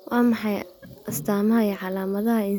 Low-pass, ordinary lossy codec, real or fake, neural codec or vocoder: none; none; real; none